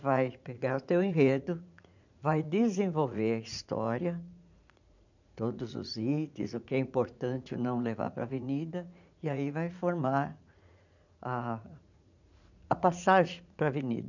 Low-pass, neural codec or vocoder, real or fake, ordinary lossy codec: 7.2 kHz; vocoder, 44.1 kHz, 80 mel bands, Vocos; fake; none